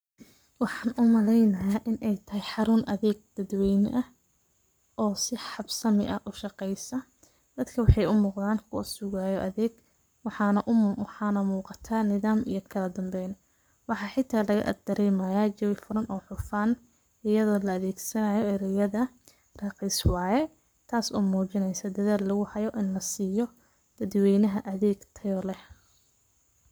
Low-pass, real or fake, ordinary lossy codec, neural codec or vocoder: none; fake; none; codec, 44.1 kHz, 7.8 kbps, Pupu-Codec